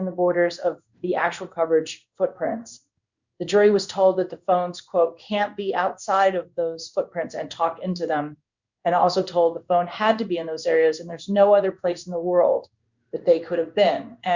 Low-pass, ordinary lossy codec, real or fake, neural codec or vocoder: 7.2 kHz; Opus, 64 kbps; fake; codec, 16 kHz in and 24 kHz out, 1 kbps, XY-Tokenizer